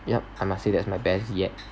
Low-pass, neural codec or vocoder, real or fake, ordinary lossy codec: none; none; real; none